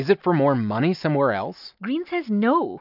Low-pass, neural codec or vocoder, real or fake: 5.4 kHz; none; real